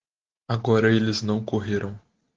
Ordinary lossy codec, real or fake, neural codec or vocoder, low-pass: Opus, 16 kbps; real; none; 7.2 kHz